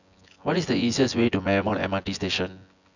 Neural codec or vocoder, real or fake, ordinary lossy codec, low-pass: vocoder, 24 kHz, 100 mel bands, Vocos; fake; none; 7.2 kHz